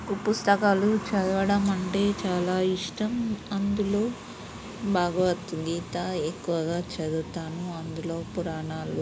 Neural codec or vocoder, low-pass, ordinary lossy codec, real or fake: none; none; none; real